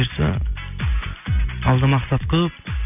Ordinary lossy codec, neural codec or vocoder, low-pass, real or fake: none; none; 3.6 kHz; real